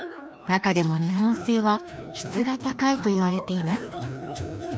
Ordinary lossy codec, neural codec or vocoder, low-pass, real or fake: none; codec, 16 kHz, 1 kbps, FreqCodec, larger model; none; fake